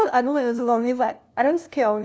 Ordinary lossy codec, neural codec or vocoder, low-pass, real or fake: none; codec, 16 kHz, 0.5 kbps, FunCodec, trained on LibriTTS, 25 frames a second; none; fake